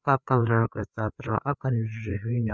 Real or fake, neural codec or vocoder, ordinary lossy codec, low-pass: fake; codec, 16 kHz, 4 kbps, FreqCodec, larger model; none; none